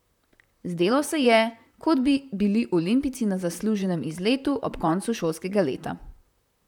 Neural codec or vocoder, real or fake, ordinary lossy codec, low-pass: vocoder, 44.1 kHz, 128 mel bands, Pupu-Vocoder; fake; none; 19.8 kHz